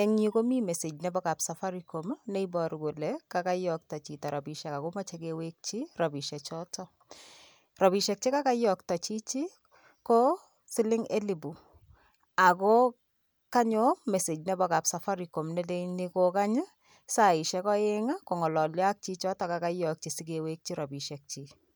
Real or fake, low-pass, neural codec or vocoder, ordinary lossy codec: real; none; none; none